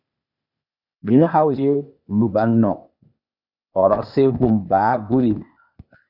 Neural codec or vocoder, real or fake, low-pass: codec, 16 kHz, 0.8 kbps, ZipCodec; fake; 5.4 kHz